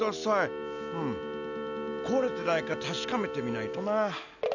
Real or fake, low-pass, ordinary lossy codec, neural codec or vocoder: real; 7.2 kHz; none; none